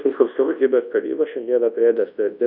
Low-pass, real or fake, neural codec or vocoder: 5.4 kHz; fake; codec, 24 kHz, 0.9 kbps, WavTokenizer, large speech release